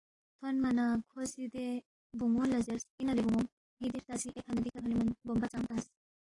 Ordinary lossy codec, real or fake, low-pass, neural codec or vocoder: AAC, 32 kbps; real; 10.8 kHz; none